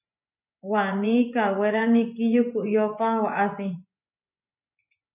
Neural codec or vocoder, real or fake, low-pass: vocoder, 24 kHz, 100 mel bands, Vocos; fake; 3.6 kHz